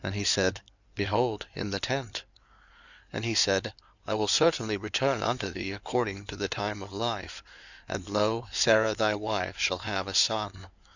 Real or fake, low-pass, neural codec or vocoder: fake; 7.2 kHz; codec, 16 kHz in and 24 kHz out, 2.2 kbps, FireRedTTS-2 codec